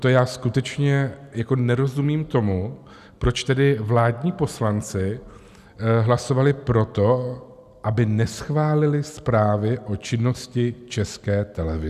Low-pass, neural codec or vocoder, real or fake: 14.4 kHz; none; real